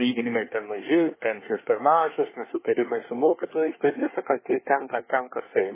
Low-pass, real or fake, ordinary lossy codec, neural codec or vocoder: 3.6 kHz; fake; MP3, 16 kbps; codec, 24 kHz, 1 kbps, SNAC